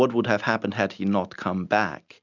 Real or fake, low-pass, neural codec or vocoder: real; 7.2 kHz; none